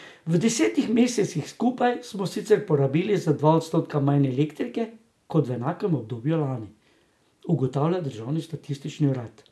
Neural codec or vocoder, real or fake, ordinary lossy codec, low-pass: none; real; none; none